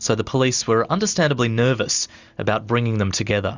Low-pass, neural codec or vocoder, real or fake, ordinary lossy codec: 7.2 kHz; none; real; Opus, 64 kbps